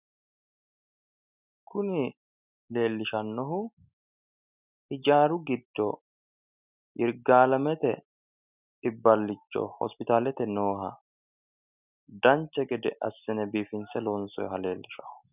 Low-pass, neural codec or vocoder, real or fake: 3.6 kHz; none; real